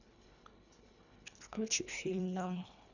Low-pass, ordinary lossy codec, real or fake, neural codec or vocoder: 7.2 kHz; none; fake; codec, 24 kHz, 1.5 kbps, HILCodec